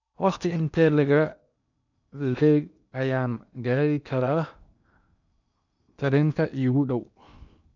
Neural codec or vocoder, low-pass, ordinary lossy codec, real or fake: codec, 16 kHz in and 24 kHz out, 0.8 kbps, FocalCodec, streaming, 65536 codes; 7.2 kHz; none; fake